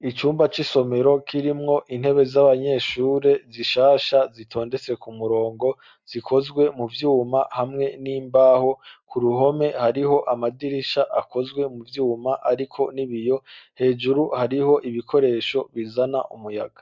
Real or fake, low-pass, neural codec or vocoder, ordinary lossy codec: real; 7.2 kHz; none; MP3, 64 kbps